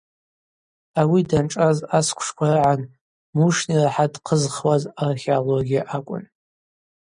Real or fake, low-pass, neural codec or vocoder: real; 9.9 kHz; none